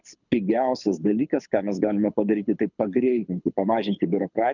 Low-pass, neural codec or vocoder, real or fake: 7.2 kHz; codec, 24 kHz, 6 kbps, HILCodec; fake